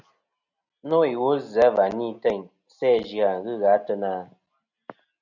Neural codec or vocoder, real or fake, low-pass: none; real; 7.2 kHz